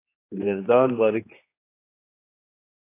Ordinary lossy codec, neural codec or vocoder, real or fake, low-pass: AAC, 24 kbps; codec, 16 kHz, 2 kbps, X-Codec, HuBERT features, trained on general audio; fake; 3.6 kHz